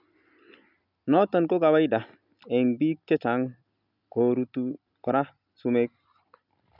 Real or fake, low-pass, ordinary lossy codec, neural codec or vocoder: real; 5.4 kHz; none; none